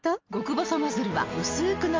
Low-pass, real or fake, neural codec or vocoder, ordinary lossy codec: 7.2 kHz; real; none; Opus, 32 kbps